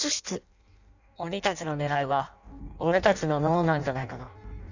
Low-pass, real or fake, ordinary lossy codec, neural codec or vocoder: 7.2 kHz; fake; none; codec, 16 kHz in and 24 kHz out, 0.6 kbps, FireRedTTS-2 codec